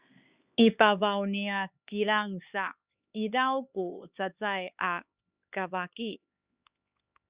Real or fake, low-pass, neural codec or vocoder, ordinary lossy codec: fake; 3.6 kHz; codec, 16 kHz, 4 kbps, X-Codec, HuBERT features, trained on LibriSpeech; Opus, 64 kbps